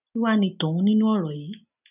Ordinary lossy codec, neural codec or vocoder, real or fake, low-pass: none; none; real; 3.6 kHz